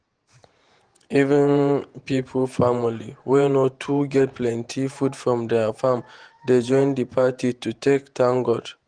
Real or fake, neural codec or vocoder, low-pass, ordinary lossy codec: fake; vocoder, 48 kHz, 128 mel bands, Vocos; 9.9 kHz; Opus, 24 kbps